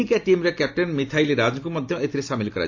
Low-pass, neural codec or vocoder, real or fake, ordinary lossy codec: 7.2 kHz; none; real; AAC, 48 kbps